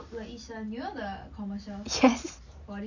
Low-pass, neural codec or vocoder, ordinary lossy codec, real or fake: 7.2 kHz; none; none; real